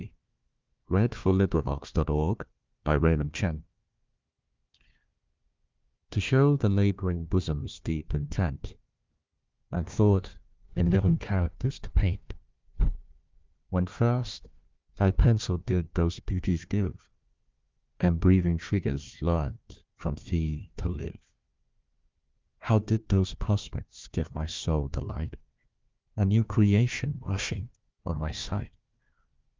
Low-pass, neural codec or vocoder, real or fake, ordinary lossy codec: 7.2 kHz; codec, 16 kHz, 1 kbps, FunCodec, trained on Chinese and English, 50 frames a second; fake; Opus, 24 kbps